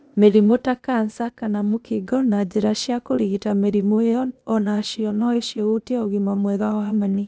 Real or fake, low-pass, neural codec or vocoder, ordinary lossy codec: fake; none; codec, 16 kHz, 0.8 kbps, ZipCodec; none